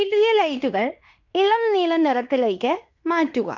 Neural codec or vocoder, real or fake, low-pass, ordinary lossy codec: codec, 16 kHz in and 24 kHz out, 0.9 kbps, LongCat-Audio-Codec, fine tuned four codebook decoder; fake; 7.2 kHz; none